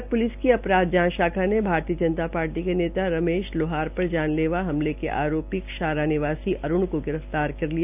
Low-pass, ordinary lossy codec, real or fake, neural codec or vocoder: 3.6 kHz; none; real; none